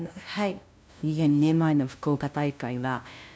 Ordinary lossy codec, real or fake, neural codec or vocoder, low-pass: none; fake; codec, 16 kHz, 0.5 kbps, FunCodec, trained on LibriTTS, 25 frames a second; none